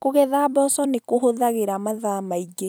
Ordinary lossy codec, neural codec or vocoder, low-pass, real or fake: none; vocoder, 44.1 kHz, 128 mel bands every 256 samples, BigVGAN v2; none; fake